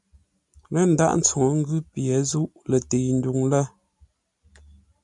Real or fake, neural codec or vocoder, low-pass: real; none; 10.8 kHz